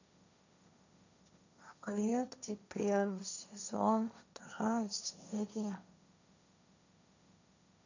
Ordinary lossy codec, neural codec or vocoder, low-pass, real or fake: none; codec, 16 kHz, 1.1 kbps, Voila-Tokenizer; 7.2 kHz; fake